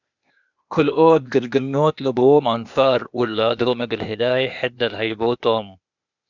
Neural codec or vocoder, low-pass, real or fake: codec, 16 kHz, 0.8 kbps, ZipCodec; 7.2 kHz; fake